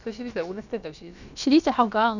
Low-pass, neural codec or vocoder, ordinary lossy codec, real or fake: 7.2 kHz; codec, 16 kHz, about 1 kbps, DyCAST, with the encoder's durations; none; fake